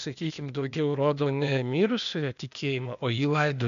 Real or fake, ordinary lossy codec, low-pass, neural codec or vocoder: fake; MP3, 96 kbps; 7.2 kHz; codec, 16 kHz, 0.8 kbps, ZipCodec